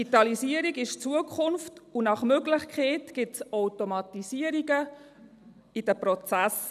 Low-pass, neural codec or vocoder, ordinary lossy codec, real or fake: 14.4 kHz; none; none; real